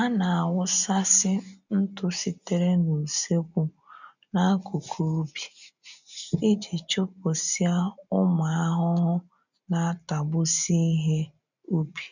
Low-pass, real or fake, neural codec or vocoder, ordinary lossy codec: 7.2 kHz; real; none; none